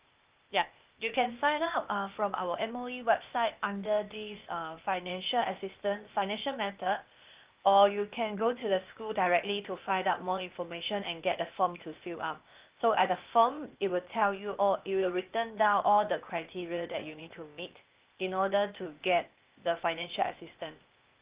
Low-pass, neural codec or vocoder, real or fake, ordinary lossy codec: 3.6 kHz; codec, 16 kHz, 0.8 kbps, ZipCodec; fake; Opus, 24 kbps